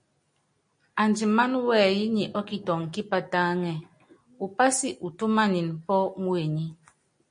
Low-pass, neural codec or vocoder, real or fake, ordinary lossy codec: 9.9 kHz; none; real; MP3, 48 kbps